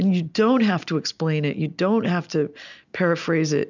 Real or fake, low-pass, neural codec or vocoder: real; 7.2 kHz; none